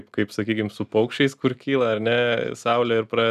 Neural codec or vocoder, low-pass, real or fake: none; 14.4 kHz; real